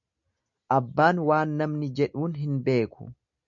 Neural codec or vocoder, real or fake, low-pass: none; real; 7.2 kHz